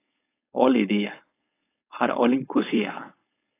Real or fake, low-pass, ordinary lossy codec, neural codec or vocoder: fake; 3.6 kHz; AAC, 16 kbps; codec, 16 kHz, 4.8 kbps, FACodec